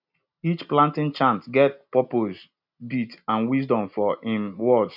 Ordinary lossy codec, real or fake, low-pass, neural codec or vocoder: none; real; 5.4 kHz; none